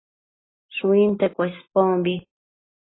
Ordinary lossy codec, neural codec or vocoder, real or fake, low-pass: AAC, 16 kbps; none; real; 7.2 kHz